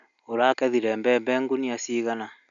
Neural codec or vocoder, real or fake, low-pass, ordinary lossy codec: none; real; 7.2 kHz; none